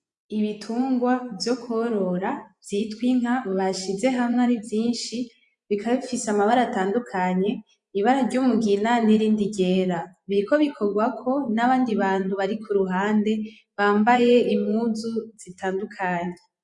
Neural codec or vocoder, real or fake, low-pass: vocoder, 24 kHz, 100 mel bands, Vocos; fake; 10.8 kHz